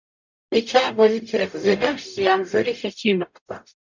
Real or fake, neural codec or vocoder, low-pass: fake; codec, 44.1 kHz, 0.9 kbps, DAC; 7.2 kHz